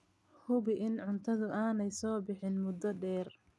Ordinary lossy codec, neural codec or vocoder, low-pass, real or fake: none; none; none; real